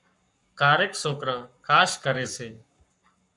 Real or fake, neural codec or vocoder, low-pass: fake; codec, 44.1 kHz, 7.8 kbps, Pupu-Codec; 10.8 kHz